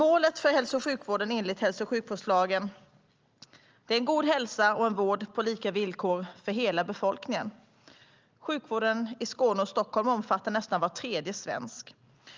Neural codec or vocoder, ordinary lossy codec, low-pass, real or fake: none; Opus, 24 kbps; 7.2 kHz; real